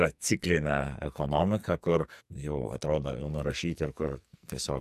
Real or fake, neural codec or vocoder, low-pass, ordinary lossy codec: fake; codec, 44.1 kHz, 2.6 kbps, SNAC; 14.4 kHz; MP3, 96 kbps